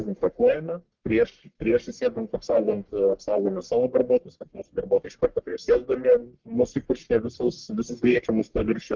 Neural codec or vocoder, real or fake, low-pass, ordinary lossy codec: codec, 44.1 kHz, 1.7 kbps, Pupu-Codec; fake; 7.2 kHz; Opus, 16 kbps